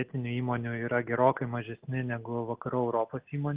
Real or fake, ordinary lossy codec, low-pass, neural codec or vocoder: real; Opus, 16 kbps; 3.6 kHz; none